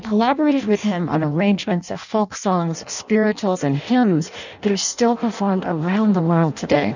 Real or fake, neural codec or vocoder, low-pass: fake; codec, 16 kHz in and 24 kHz out, 0.6 kbps, FireRedTTS-2 codec; 7.2 kHz